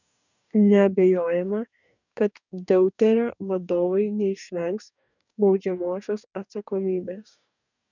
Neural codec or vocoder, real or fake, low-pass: codec, 44.1 kHz, 2.6 kbps, DAC; fake; 7.2 kHz